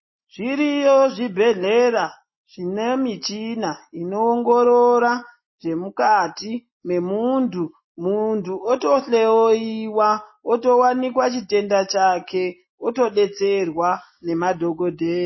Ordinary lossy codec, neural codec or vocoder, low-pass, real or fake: MP3, 24 kbps; none; 7.2 kHz; real